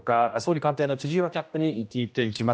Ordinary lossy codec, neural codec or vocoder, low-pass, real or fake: none; codec, 16 kHz, 1 kbps, X-Codec, HuBERT features, trained on general audio; none; fake